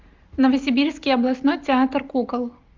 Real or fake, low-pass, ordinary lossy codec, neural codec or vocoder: real; 7.2 kHz; Opus, 24 kbps; none